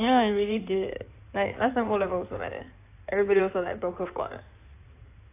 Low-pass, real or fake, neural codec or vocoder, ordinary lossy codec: 3.6 kHz; fake; codec, 16 kHz in and 24 kHz out, 2.2 kbps, FireRedTTS-2 codec; AAC, 24 kbps